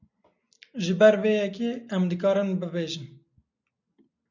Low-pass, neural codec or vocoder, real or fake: 7.2 kHz; none; real